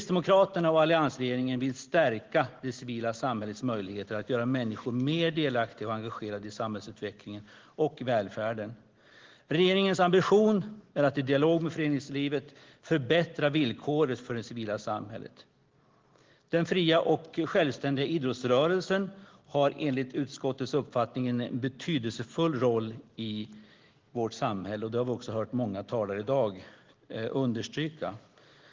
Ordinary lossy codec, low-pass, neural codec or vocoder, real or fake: Opus, 16 kbps; 7.2 kHz; none; real